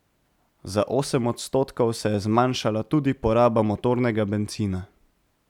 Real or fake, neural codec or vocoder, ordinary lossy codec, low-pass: real; none; none; 19.8 kHz